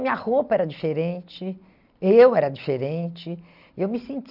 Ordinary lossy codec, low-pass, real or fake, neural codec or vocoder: none; 5.4 kHz; real; none